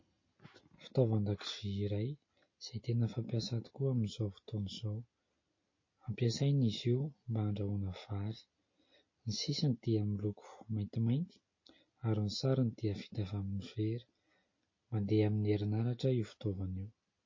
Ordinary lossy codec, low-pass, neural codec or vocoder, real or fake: MP3, 32 kbps; 7.2 kHz; none; real